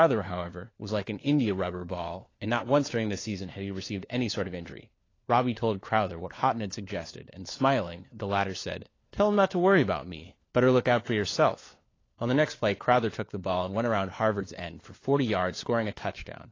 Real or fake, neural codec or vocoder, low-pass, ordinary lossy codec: fake; codec, 16 kHz, 4 kbps, FunCodec, trained on LibriTTS, 50 frames a second; 7.2 kHz; AAC, 32 kbps